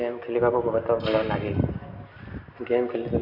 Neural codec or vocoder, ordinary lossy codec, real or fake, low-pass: none; none; real; 5.4 kHz